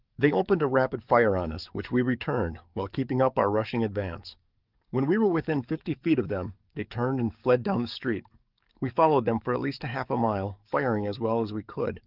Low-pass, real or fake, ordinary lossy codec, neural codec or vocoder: 5.4 kHz; fake; Opus, 24 kbps; codec, 44.1 kHz, 7.8 kbps, DAC